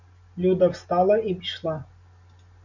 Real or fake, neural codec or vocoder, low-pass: real; none; 7.2 kHz